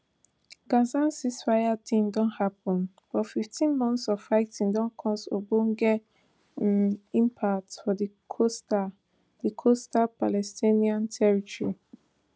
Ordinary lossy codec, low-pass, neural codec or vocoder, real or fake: none; none; none; real